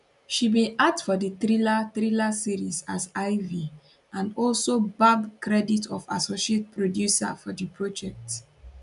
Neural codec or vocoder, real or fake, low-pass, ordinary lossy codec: none; real; 10.8 kHz; none